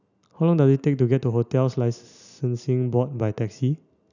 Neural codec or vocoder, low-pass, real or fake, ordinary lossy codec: none; 7.2 kHz; real; none